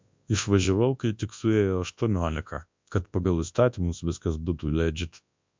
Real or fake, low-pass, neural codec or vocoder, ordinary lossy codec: fake; 7.2 kHz; codec, 24 kHz, 0.9 kbps, WavTokenizer, large speech release; MP3, 64 kbps